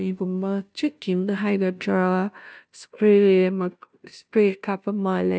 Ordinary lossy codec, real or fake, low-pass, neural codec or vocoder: none; fake; none; codec, 16 kHz, 0.5 kbps, FunCodec, trained on Chinese and English, 25 frames a second